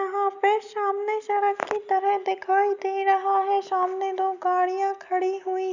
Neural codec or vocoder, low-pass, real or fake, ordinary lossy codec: none; 7.2 kHz; real; none